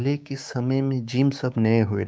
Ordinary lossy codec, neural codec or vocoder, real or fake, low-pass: none; codec, 16 kHz, 6 kbps, DAC; fake; none